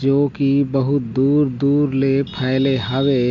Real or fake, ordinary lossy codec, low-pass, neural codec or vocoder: real; none; 7.2 kHz; none